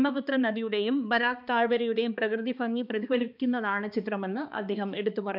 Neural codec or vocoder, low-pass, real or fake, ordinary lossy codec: codec, 16 kHz, 2 kbps, X-Codec, HuBERT features, trained on balanced general audio; 5.4 kHz; fake; none